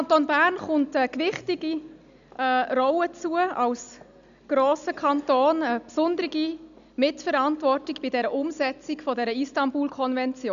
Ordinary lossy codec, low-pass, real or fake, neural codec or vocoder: MP3, 96 kbps; 7.2 kHz; real; none